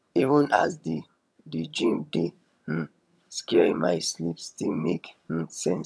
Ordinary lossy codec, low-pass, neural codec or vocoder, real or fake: none; none; vocoder, 22.05 kHz, 80 mel bands, HiFi-GAN; fake